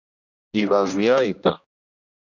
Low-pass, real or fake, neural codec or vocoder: 7.2 kHz; fake; codec, 16 kHz, 1 kbps, X-Codec, HuBERT features, trained on general audio